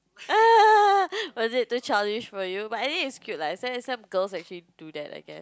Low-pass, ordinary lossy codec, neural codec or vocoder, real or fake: none; none; none; real